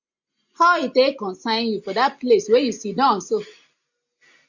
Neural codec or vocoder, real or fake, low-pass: none; real; 7.2 kHz